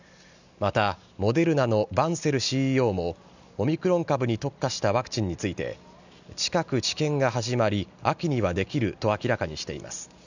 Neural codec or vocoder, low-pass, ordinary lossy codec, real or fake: none; 7.2 kHz; none; real